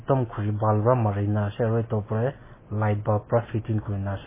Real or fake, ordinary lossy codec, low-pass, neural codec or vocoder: real; MP3, 16 kbps; 3.6 kHz; none